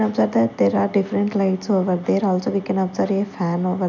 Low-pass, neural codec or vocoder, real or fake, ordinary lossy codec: 7.2 kHz; none; real; none